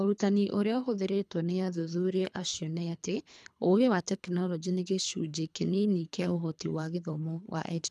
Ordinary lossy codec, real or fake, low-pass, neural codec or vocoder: none; fake; none; codec, 24 kHz, 3 kbps, HILCodec